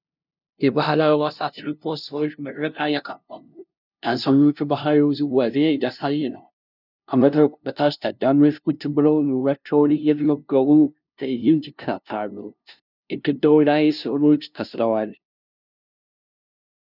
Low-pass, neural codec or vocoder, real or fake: 5.4 kHz; codec, 16 kHz, 0.5 kbps, FunCodec, trained on LibriTTS, 25 frames a second; fake